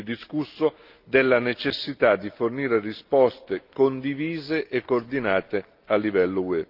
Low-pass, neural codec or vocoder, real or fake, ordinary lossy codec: 5.4 kHz; none; real; Opus, 24 kbps